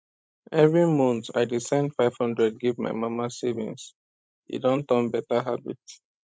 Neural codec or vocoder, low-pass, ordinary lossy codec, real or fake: codec, 16 kHz, 16 kbps, FreqCodec, larger model; none; none; fake